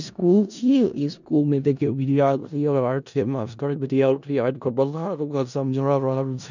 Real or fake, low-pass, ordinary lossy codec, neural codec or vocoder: fake; 7.2 kHz; none; codec, 16 kHz in and 24 kHz out, 0.4 kbps, LongCat-Audio-Codec, four codebook decoder